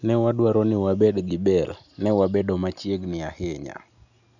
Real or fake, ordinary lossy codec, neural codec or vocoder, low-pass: real; none; none; 7.2 kHz